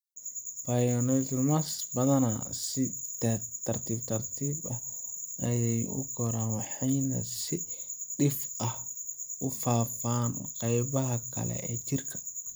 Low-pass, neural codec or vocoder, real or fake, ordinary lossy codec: none; vocoder, 44.1 kHz, 128 mel bands every 256 samples, BigVGAN v2; fake; none